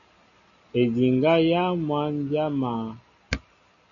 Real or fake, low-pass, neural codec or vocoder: real; 7.2 kHz; none